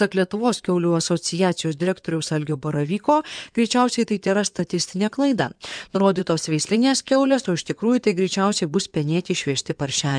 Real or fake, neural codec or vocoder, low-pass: fake; codec, 16 kHz in and 24 kHz out, 2.2 kbps, FireRedTTS-2 codec; 9.9 kHz